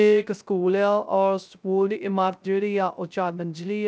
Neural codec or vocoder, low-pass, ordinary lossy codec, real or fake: codec, 16 kHz, 0.3 kbps, FocalCodec; none; none; fake